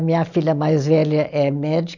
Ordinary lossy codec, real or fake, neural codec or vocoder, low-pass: none; real; none; 7.2 kHz